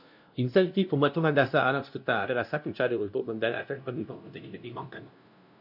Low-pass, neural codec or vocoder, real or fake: 5.4 kHz; codec, 16 kHz, 0.5 kbps, FunCodec, trained on LibriTTS, 25 frames a second; fake